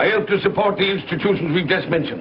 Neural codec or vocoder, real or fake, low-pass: none; real; 5.4 kHz